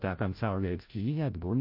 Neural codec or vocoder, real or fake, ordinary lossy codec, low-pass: codec, 16 kHz, 0.5 kbps, FreqCodec, larger model; fake; MP3, 32 kbps; 5.4 kHz